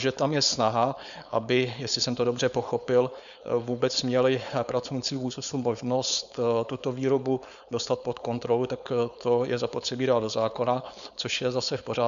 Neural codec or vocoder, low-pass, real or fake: codec, 16 kHz, 4.8 kbps, FACodec; 7.2 kHz; fake